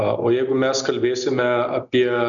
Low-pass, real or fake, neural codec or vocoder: 7.2 kHz; real; none